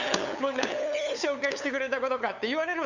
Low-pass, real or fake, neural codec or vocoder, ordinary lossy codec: 7.2 kHz; fake; codec, 16 kHz, 8 kbps, FunCodec, trained on LibriTTS, 25 frames a second; none